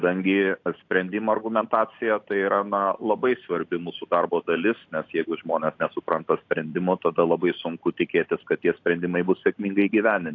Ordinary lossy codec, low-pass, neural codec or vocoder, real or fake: AAC, 48 kbps; 7.2 kHz; none; real